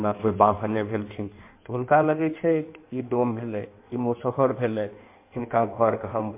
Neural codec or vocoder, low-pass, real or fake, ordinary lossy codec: codec, 16 kHz in and 24 kHz out, 1.1 kbps, FireRedTTS-2 codec; 3.6 kHz; fake; MP3, 24 kbps